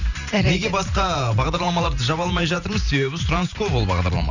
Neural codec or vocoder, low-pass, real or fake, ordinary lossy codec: vocoder, 44.1 kHz, 128 mel bands every 512 samples, BigVGAN v2; 7.2 kHz; fake; none